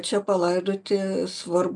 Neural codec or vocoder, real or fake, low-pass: none; real; 10.8 kHz